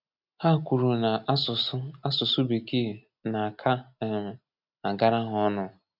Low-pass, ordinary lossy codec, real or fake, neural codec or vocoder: 5.4 kHz; none; real; none